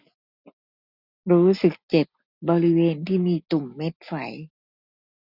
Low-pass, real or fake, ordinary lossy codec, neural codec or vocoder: 5.4 kHz; real; none; none